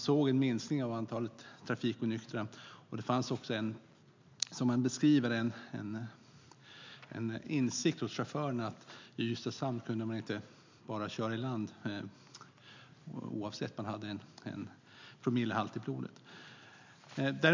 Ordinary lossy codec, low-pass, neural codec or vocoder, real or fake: MP3, 64 kbps; 7.2 kHz; none; real